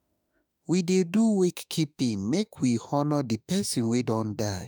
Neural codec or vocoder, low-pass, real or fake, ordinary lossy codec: autoencoder, 48 kHz, 32 numbers a frame, DAC-VAE, trained on Japanese speech; none; fake; none